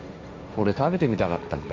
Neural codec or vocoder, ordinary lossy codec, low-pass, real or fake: codec, 16 kHz, 1.1 kbps, Voila-Tokenizer; none; none; fake